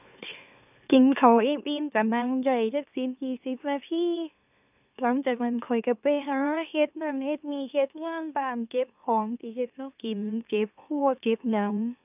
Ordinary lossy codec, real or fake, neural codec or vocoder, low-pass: none; fake; autoencoder, 44.1 kHz, a latent of 192 numbers a frame, MeloTTS; 3.6 kHz